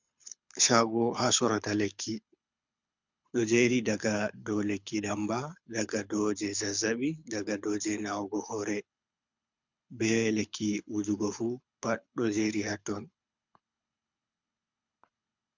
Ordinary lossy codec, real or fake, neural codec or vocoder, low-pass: MP3, 64 kbps; fake; codec, 24 kHz, 6 kbps, HILCodec; 7.2 kHz